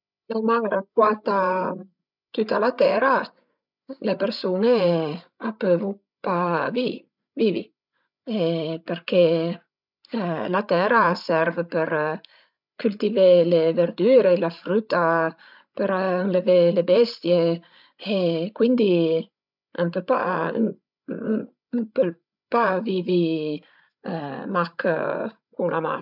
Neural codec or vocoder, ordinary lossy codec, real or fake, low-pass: codec, 16 kHz, 16 kbps, FreqCodec, larger model; none; fake; 5.4 kHz